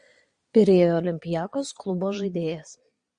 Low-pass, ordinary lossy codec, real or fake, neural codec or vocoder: 9.9 kHz; MP3, 96 kbps; fake; vocoder, 22.05 kHz, 80 mel bands, Vocos